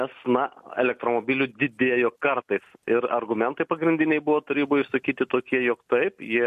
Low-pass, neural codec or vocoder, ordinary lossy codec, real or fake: 14.4 kHz; none; MP3, 64 kbps; real